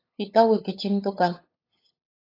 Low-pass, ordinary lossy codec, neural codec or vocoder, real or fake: 5.4 kHz; AAC, 24 kbps; codec, 16 kHz, 8 kbps, FunCodec, trained on LibriTTS, 25 frames a second; fake